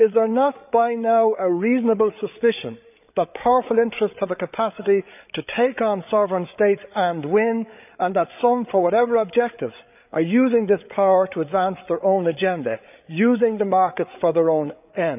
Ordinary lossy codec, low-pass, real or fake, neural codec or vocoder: none; 3.6 kHz; fake; codec, 16 kHz, 8 kbps, FreqCodec, larger model